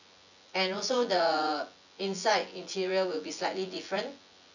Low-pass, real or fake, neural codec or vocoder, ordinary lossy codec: 7.2 kHz; fake; vocoder, 24 kHz, 100 mel bands, Vocos; none